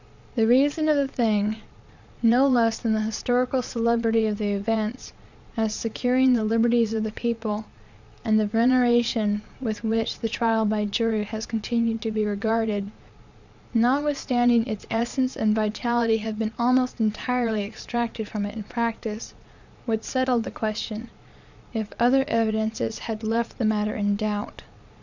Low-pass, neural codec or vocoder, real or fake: 7.2 kHz; vocoder, 22.05 kHz, 80 mel bands, Vocos; fake